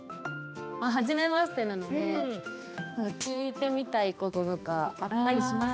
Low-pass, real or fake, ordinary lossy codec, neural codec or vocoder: none; fake; none; codec, 16 kHz, 2 kbps, X-Codec, HuBERT features, trained on balanced general audio